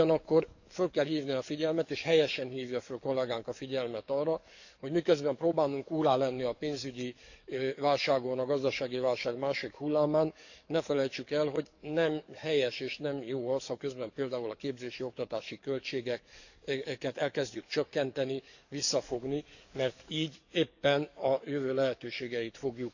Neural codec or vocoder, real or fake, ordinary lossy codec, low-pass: codec, 44.1 kHz, 7.8 kbps, DAC; fake; none; 7.2 kHz